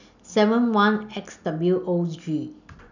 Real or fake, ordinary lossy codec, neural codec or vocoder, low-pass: real; none; none; 7.2 kHz